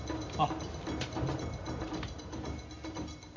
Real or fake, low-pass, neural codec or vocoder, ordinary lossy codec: real; 7.2 kHz; none; MP3, 48 kbps